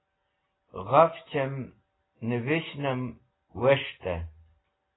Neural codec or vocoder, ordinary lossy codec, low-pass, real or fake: none; AAC, 16 kbps; 7.2 kHz; real